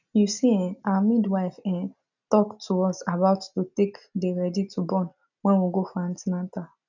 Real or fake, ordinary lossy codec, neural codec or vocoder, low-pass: real; none; none; 7.2 kHz